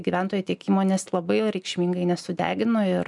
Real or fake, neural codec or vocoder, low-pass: real; none; 10.8 kHz